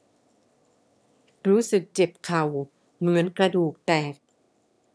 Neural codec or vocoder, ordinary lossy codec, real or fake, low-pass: autoencoder, 22.05 kHz, a latent of 192 numbers a frame, VITS, trained on one speaker; none; fake; none